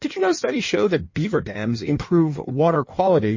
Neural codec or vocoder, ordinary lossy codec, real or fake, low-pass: codec, 16 kHz in and 24 kHz out, 1.1 kbps, FireRedTTS-2 codec; MP3, 32 kbps; fake; 7.2 kHz